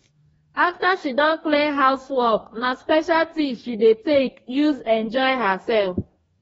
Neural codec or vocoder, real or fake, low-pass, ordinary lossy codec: codec, 44.1 kHz, 2.6 kbps, DAC; fake; 19.8 kHz; AAC, 24 kbps